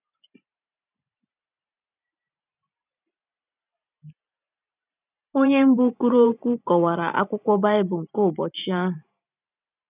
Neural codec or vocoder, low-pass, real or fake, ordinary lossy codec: vocoder, 44.1 kHz, 128 mel bands every 512 samples, BigVGAN v2; 3.6 kHz; fake; none